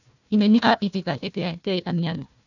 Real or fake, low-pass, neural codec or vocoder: fake; 7.2 kHz; codec, 16 kHz, 1 kbps, FunCodec, trained on Chinese and English, 50 frames a second